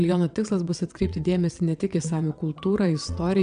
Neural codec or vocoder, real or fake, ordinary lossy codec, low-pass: vocoder, 22.05 kHz, 80 mel bands, WaveNeXt; fake; MP3, 96 kbps; 9.9 kHz